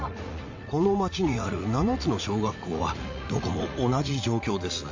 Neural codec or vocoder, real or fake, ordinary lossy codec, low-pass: vocoder, 44.1 kHz, 80 mel bands, Vocos; fake; MP3, 48 kbps; 7.2 kHz